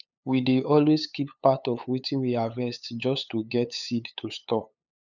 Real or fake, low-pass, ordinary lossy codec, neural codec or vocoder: fake; 7.2 kHz; none; codec, 16 kHz, 8 kbps, FunCodec, trained on LibriTTS, 25 frames a second